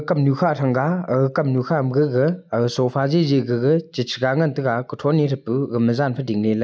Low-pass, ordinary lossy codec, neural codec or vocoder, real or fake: none; none; none; real